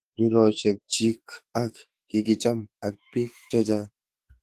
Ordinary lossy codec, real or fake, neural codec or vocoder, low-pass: Opus, 16 kbps; fake; autoencoder, 48 kHz, 32 numbers a frame, DAC-VAE, trained on Japanese speech; 14.4 kHz